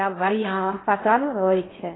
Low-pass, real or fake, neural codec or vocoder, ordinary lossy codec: 7.2 kHz; fake; codec, 16 kHz in and 24 kHz out, 0.6 kbps, FocalCodec, streaming, 4096 codes; AAC, 16 kbps